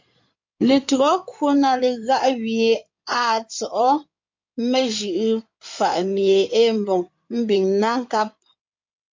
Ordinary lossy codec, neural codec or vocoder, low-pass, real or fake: MP3, 48 kbps; codec, 16 kHz in and 24 kHz out, 2.2 kbps, FireRedTTS-2 codec; 7.2 kHz; fake